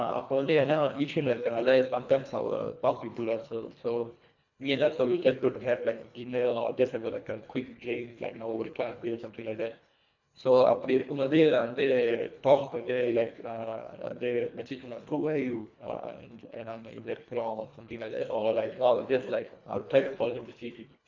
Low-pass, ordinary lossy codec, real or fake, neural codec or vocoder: 7.2 kHz; none; fake; codec, 24 kHz, 1.5 kbps, HILCodec